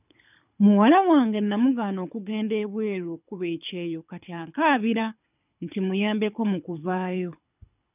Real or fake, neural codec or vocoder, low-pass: fake; codec, 24 kHz, 6 kbps, HILCodec; 3.6 kHz